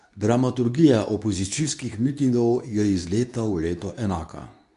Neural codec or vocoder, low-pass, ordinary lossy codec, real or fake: codec, 24 kHz, 0.9 kbps, WavTokenizer, medium speech release version 2; 10.8 kHz; none; fake